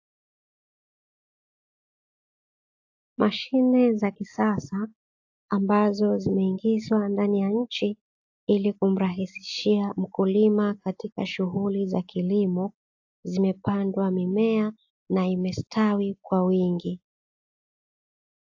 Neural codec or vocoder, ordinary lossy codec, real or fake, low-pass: none; AAC, 48 kbps; real; 7.2 kHz